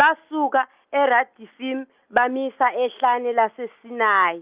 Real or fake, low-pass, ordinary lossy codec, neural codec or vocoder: real; 3.6 kHz; Opus, 32 kbps; none